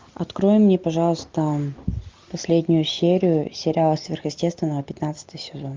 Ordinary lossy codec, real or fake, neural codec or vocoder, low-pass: Opus, 24 kbps; real; none; 7.2 kHz